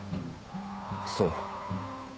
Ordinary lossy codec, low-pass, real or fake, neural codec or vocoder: none; none; fake; codec, 16 kHz, 8 kbps, FunCodec, trained on Chinese and English, 25 frames a second